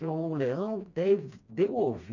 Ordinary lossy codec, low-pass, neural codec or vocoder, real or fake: none; 7.2 kHz; codec, 16 kHz, 2 kbps, FreqCodec, smaller model; fake